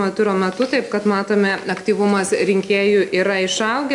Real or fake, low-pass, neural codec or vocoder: real; 10.8 kHz; none